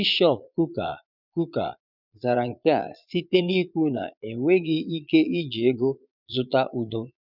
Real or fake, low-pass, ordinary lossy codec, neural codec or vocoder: fake; 5.4 kHz; none; vocoder, 22.05 kHz, 80 mel bands, Vocos